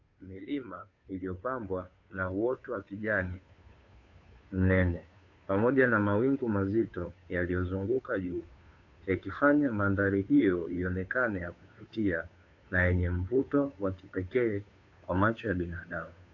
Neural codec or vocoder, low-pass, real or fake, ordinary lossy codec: codec, 16 kHz, 2 kbps, FunCodec, trained on Chinese and English, 25 frames a second; 7.2 kHz; fake; AAC, 48 kbps